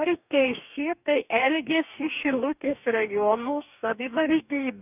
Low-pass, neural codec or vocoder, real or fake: 3.6 kHz; codec, 44.1 kHz, 2.6 kbps, DAC; fake